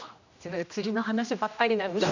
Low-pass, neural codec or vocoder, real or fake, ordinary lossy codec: 7.2 kHz; codec, 16 kHz, 1 kbps, X-Codec, HuBERT features, trained on general audio; fake; none